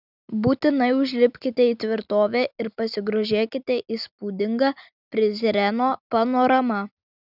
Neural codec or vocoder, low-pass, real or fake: none; 5.4 kHz; real